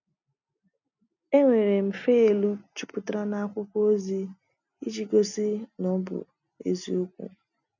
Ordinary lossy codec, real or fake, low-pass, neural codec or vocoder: none; real; 7.2 kHz; none